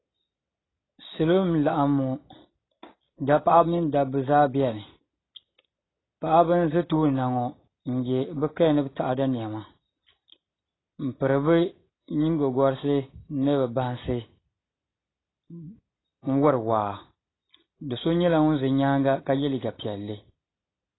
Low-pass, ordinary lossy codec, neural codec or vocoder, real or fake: 7.2 kHz; AAC, 16 kbps; none; real